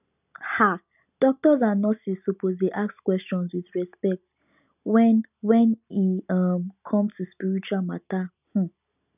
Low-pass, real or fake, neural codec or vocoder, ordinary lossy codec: 3.6 kHz; real; none; none